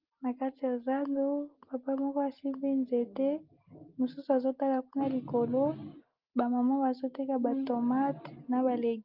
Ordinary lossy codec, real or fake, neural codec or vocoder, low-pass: Opus, 32 kbps; real; none; 5.4 kHz